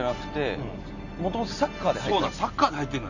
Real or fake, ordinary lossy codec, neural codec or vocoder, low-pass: real; none; none; 7.2 kHz